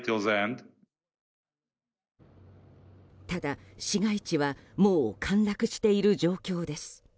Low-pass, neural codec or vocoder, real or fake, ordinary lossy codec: none; none; real; none